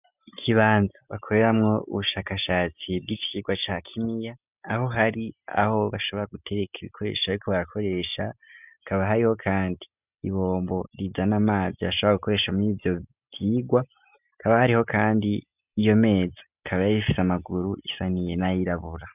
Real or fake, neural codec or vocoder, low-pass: real; none; 3.6 kHz